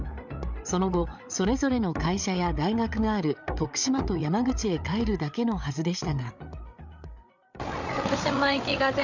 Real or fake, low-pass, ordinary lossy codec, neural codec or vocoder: fake; 7.2 kHz; none; codec, 16 kHz, 8 kbps, FreqCodec, larger model